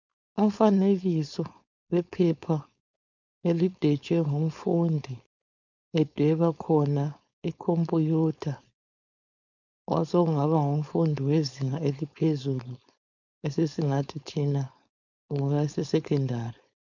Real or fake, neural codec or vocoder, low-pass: fake; codec, 16 kHz, 4.8 kbps, FACodec; 7.2 kHz